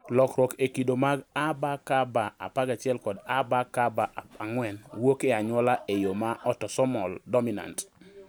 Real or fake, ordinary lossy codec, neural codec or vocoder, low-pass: real; none; none; none